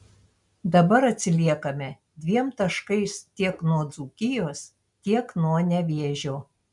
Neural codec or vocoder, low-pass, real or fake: none; 10.8 kHz; real